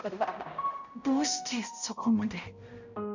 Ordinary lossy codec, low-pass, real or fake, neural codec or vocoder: none; 7.2 kHz; fake; codec, 16 kHz, 0.5 kbps, X-Codec, HuBERT features, trained on balanced general audio